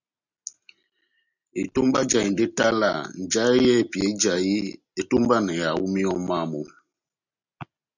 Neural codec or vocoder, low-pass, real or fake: none; 7.2 kHz; real